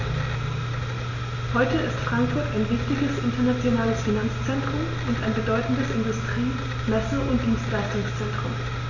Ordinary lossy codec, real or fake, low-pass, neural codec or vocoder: none; real; 7.2 kHz; none